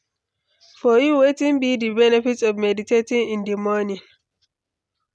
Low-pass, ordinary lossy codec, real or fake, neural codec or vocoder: none; none; real; none